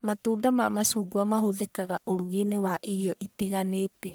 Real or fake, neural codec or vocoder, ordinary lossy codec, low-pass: fake; codec, 44.1 kHz, 1.7 kbps, Pupu-Codec; none; none